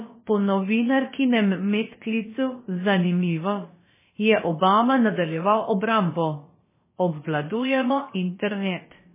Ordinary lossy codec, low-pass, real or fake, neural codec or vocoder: MP3, 16 kbps; 3.6 kHz; fake; codec, 16 kHz, about 1 kbps, DyCAST, with the encoder's durations